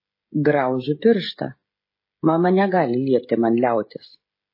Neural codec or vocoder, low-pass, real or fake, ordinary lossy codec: codec, 16 kHz, 16 kbps, FreqCodec, smaller model; 5.4 kHz; fake; MP3, 24 kbps